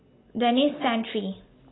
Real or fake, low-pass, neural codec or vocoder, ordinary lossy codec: real; 7.2 kHz; none; AAC, 16 kbps